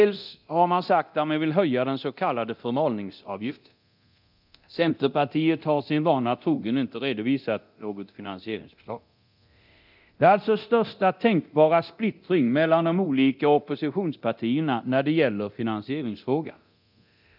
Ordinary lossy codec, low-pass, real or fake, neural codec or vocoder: none; 5.4 kHz; fake; codec, 24 kHz, 0.9 kbps, DualCodec